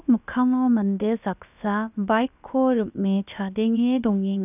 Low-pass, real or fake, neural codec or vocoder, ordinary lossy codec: 3.6 kHz; fake; codec, 16 kHz, about 1 kbps, DyCAST, with the encoder's durations; none